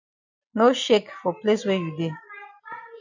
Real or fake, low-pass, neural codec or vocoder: real; 7.2 kHz; none